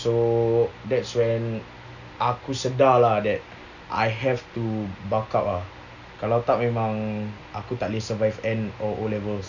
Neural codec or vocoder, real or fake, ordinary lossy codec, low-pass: none; real; none; 7.2 kHz